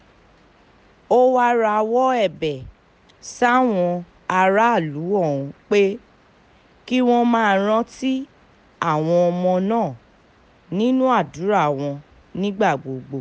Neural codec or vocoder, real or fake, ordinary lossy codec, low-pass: none; real; none; none